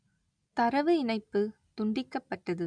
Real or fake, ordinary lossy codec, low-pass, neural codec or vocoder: real; none; 9.9 kHz; none